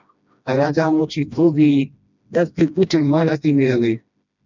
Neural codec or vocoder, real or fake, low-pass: codec, 16 kHz, 1 kbps, FreqCodec, smaller model; fake; 7.2 kHz